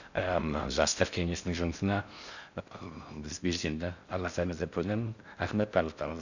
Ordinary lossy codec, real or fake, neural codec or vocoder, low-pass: none; fake; codec, 16 kHz in and 24 kHz out, 0.6 kbps, FocalCodec, streaming, 4096 codes; 7.2 kHz